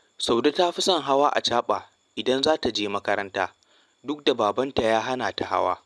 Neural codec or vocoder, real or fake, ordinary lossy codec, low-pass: none; real; none; none